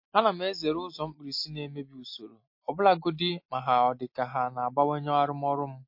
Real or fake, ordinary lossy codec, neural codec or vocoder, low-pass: real; MP3, 32 kbps; none; 5.4 kHz